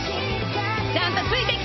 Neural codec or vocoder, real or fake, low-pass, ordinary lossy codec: none; real; 7.2 kHz; MP3, 24 kbps